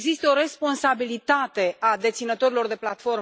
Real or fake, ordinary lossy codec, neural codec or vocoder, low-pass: real; none; none; none